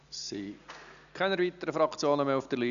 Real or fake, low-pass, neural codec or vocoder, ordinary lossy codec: real; 7.2 kHz; none; none